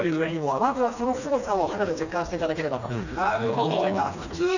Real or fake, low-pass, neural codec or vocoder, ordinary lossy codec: fake; 7.2 kHz; codec, 16 kHz, 2 kbps, FreqCodec, smaller model; none